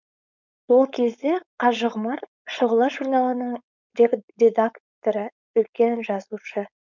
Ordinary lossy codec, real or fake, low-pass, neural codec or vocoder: none; fake; 7.2 kHz; codec, 16 kHz, 4.8 kbps, FACodec